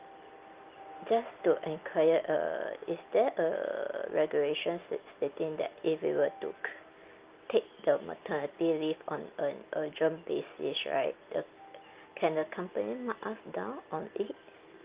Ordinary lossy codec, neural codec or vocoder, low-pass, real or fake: Opus, 24 kbps; none; 3.6 kHz; real